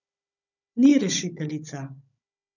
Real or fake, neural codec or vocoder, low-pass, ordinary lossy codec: fake; codec, 16 kHz, 16 kbps, FunCodec, trained on Chinese and English, 50 frames a second; 7.2 kHz; none